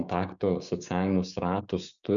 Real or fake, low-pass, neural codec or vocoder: fake; 7.2 kHz; codec, 16 kHz, 6 kbps, DAC